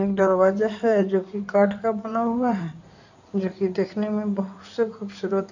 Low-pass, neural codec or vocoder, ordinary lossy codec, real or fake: 7.2 kHz; codec, 16 kHz in and 24 kHz out, 2.2 kbps, FireRedTTS-2 codec; none; fake